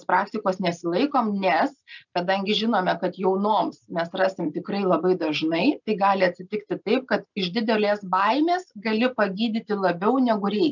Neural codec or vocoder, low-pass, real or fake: none; 7.2 kHz; real